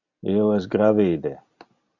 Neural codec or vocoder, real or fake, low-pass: none; real; 7.2 kHz